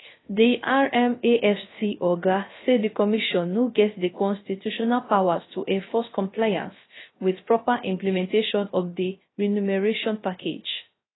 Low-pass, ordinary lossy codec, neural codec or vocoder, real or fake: 7.2 kHz; AAC, 16 kbps; codec, 16 kHz, 0.3 kbps, FocalCodec; fake